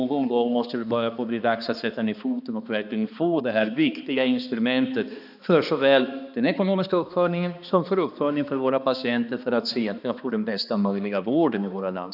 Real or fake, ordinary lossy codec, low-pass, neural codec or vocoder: fake; none; 5.4 kHz; codec, 16 kHz, 2 kbps, X-Codec, HuBERT features, trained on balanced general audio